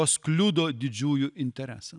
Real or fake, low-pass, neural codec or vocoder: real; 10.8 kHz; none